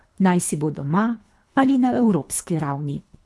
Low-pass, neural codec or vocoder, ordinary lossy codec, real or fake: none; codec, 24 kHz, 3 kbps, HILCodec; none; fake